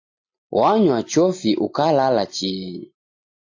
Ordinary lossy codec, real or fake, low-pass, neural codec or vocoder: AAC, 48 kbps; real; 7.2 kHz; none